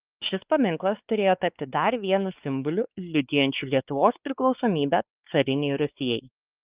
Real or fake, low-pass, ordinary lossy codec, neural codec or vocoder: fake; 3.6 kHz; Opus, 24 kbps; codec, 16 kHz, 4 kbps, X-Codec, WavLM features, trained on Multilingual LibriSpeech